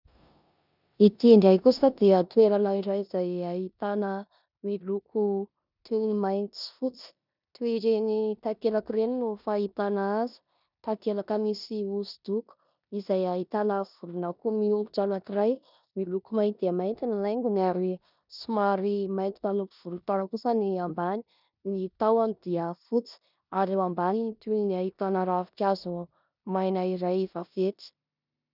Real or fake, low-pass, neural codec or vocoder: fake; 5.4 kHz; codec, 16 kHz in and 24 kHz out, 0.9 kbps, LongCat-Audio-Codec, four codebook decoder